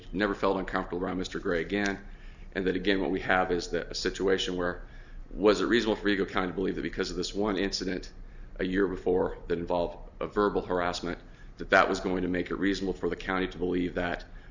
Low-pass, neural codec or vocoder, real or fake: 7.2 kHz; none; real